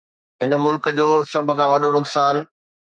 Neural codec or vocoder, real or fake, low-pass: codec, 32 kHz, 1.9 kbps, SNAC; fake; 9.9 kHz